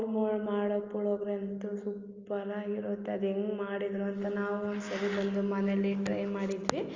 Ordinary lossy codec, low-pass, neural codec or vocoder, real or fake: Opus, 32 kbps; 7.2 kHz; none; real